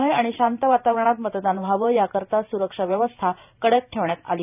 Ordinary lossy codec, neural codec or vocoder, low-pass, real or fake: none; vocoder, 44.1 kHz, 128 mel bands every 512 samples, BigVGAN v2; 3.6 kHz; fake